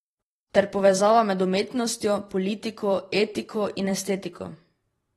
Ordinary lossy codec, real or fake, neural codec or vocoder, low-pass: AAC, 32 kbps; fake; vocoder, 44.1 kHz, 128 mel bands every 512 samples, BigVGAN v2; 19.8 kHz